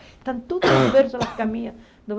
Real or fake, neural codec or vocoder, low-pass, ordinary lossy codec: real; none; none; none